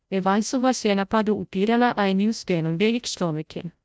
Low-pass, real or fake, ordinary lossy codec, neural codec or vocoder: none; fake; none; codec, 16 kHz, 0.5 kbps, FreqCodec, larger model